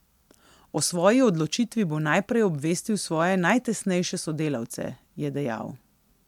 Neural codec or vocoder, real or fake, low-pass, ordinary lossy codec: none; real; 19.8 kHz; MP3, 96 kbps